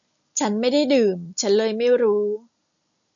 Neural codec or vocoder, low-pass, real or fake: none; 7.2 kHz; real